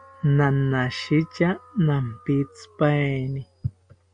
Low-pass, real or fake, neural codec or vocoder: 9.9 kHz; real; none